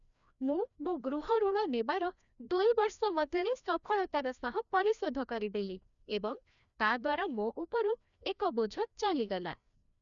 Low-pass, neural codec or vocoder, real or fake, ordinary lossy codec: 7.2 kHz; codec, 16 kHz, 1 kbps, FreqCodec, larger model; fake; none